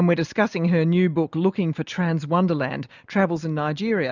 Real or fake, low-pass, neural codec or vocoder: real; 7.2 kHz; none